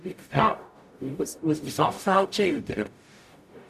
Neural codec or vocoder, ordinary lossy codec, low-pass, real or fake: codec, 44.1 kHz, 0.9 kbps, DAC; none; 14.4 kHz; fake